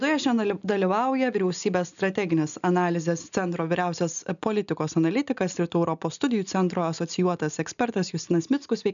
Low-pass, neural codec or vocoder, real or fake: 7.2 kHz; none; real